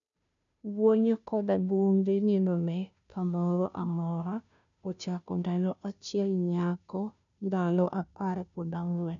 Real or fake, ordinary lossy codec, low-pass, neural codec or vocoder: fake; none; 7.2 kHz; codec, 16 kHz, 0.5 kbps, FunCodec, trained on Chinese and English, 25 frames a second